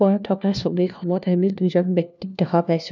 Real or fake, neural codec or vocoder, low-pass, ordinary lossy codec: fake; codec, 16 kHz, 1 kbps, FunCodec, trained on LibriTTS, 50 frames a second; 7.2 kHz; none